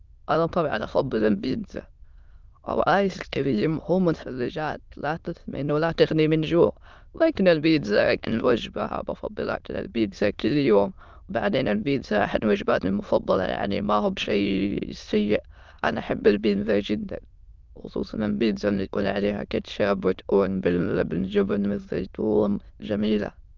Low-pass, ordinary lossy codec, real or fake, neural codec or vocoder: 7.2 kHz; Opus, 24 kbps; fake; autoencoder, 22.05 kHz, a latent of 192 numbers a frame, VITS, trained on many speakers